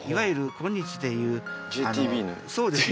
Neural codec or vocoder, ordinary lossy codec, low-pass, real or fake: none; none; none; real